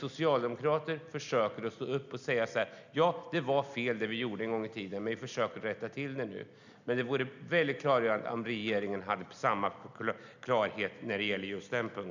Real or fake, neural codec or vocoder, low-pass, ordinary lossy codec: real; none; 7.2 kHz; none